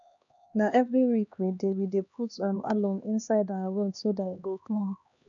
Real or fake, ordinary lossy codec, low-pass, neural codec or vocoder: fake; none; 7.2 kHz; codec, 16 kHz, 2 kbps, X-Codec, HuBERT features, trained on LibriSpeech